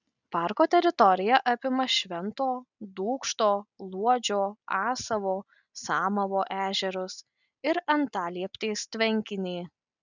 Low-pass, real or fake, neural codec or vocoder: 7.2 kHz; real; none